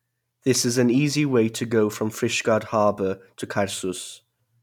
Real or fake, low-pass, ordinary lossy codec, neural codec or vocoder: real; 19.8 kHz; none; none